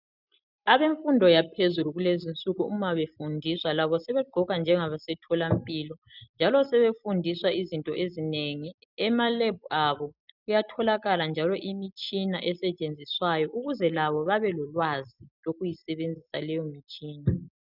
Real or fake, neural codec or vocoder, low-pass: real; none; 5.4 kHz